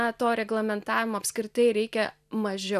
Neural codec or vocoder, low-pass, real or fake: none; 14.4 kHz; real